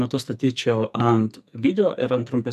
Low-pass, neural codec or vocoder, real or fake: 14.4 kHz; codec, 44.1 kHz, 2.6 kbps, SNAC; fake